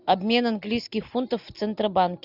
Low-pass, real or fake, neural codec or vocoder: 5.4 kHz; real; none